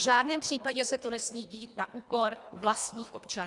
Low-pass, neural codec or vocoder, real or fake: 10.8 kHz; codec, 24 kHz, 1.5 kbps, HILCodec; fake